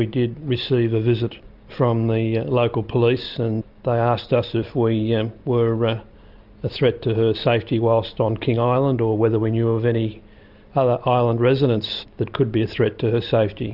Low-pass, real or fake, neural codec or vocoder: 5.4 kHz; real; none